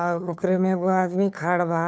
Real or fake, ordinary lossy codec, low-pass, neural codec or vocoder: fake; none; none; codec, 16 kHz, 2 kbps, FunCodec, trained on Chinese and English, 25 frames a second